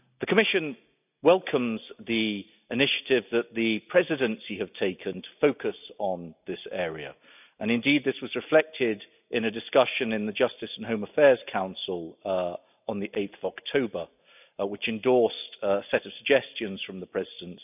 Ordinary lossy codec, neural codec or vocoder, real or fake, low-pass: none; none; real; 3.6 kHz